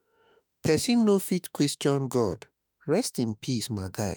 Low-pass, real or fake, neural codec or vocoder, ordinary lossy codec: none; fake; autoencoder, 48 kHz, 32 numbers a frame, DAC-VAE, trained on Japanese speech; none